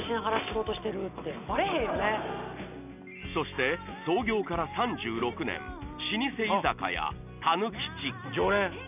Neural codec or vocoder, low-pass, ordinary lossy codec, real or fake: none; 3.6 kHz; none; real